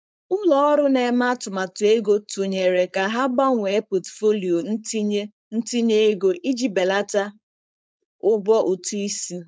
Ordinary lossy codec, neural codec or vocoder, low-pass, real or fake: none; codec, 16 kHz, 4.8 kbps, FACodec; none; fake